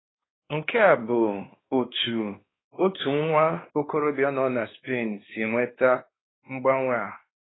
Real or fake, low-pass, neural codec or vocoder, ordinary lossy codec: fake; 7.2 kHz; codec, 16 kHz, 2 kbps, X-Codec, WavLM features, trained on Multilingual LibriSpeech; AAC, 16 kbps